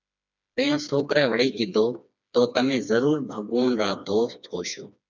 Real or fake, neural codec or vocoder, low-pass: fake; codec, 16 kHz, 2 kbps, FreqCodec, smaller model; 7.2 kHz